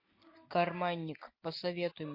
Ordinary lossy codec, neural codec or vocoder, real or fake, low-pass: MP3, 32 kbps; none; real; 5.4 kHz